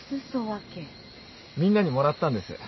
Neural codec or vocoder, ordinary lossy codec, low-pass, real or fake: none; MP3, 24 kbps; 7.2 kHz; real